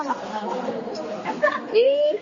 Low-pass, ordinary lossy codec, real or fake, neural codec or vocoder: 7.2 kHz; MP3, 32 kbps; fake; codec, 16 kHz, 2 kbps, X-Codec, HuBERT features, trained on general audio